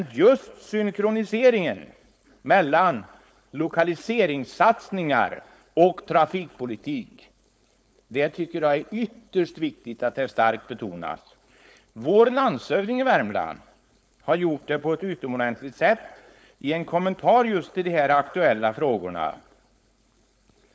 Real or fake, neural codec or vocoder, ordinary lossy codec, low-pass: fake; codec, 16 kHz, 4.8 kbps, FACodec; none; none